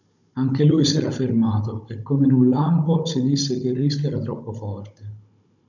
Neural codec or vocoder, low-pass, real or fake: codec, 16 kHz, 16 kbps, FunCodec, trained on Chinese and English, 50 frames a second; 7.2 kHz; fake